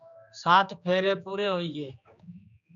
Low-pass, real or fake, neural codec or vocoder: 7.2 kHz; fake; codec, 16 kHz, 2 kbps, X-Codec, HuBERT features, trained on general audio